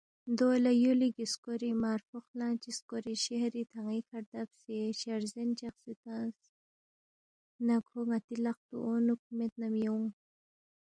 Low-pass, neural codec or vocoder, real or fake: 9.9 kHz; none; real